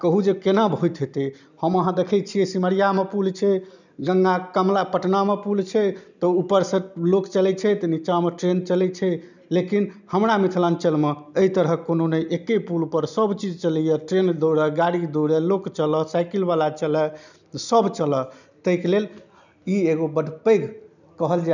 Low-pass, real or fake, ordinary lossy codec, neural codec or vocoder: 7.2 kHz; real; none; none